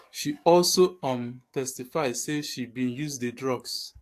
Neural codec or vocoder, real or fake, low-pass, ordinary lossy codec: codec, 44.1 kHz, 7.8 kbps, DAC; fake; 14.4 kHz; AAC, 64 kbps